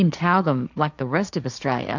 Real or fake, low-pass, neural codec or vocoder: fake; 7.2 kHz; codec, 16 kHz, 1.1 kbps, Voila-Tokenizer